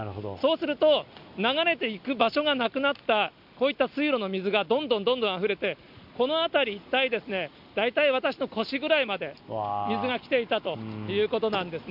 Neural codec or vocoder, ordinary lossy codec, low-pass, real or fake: none; none; 5.4 kHz; real